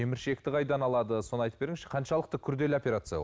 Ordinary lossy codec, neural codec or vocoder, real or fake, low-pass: none; none; real; none